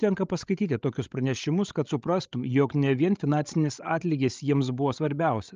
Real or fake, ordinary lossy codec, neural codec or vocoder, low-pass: fake; Opus, 24 kbps; codec, 16 kHz, 16 kbps, FreqCodec, larger model; 7.2 kHz